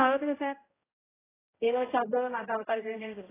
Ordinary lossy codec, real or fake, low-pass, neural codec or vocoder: AAC, 16 kbps; fake; 3.6 kHz; codec, 16 kHz, 0.5 kbps, X-Codec, HuBERT features, trained on general audio